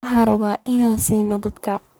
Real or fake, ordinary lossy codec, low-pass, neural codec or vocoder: fake; none; none; codec, 44.1 kHz, 1.7 kbps, Pupu-Codec